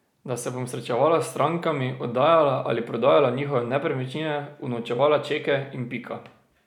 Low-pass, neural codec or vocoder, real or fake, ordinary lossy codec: 19.8 kHz; none; real; none